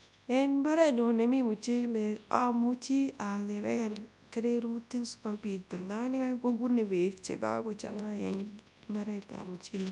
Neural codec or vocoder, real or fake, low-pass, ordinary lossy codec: codec, 24 kHz, 0.9 kbps, WavTokenizer, large speech release; fake; 10.8 kHz; none